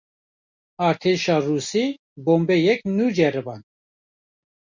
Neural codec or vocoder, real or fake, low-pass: none; real; 7.2 kHz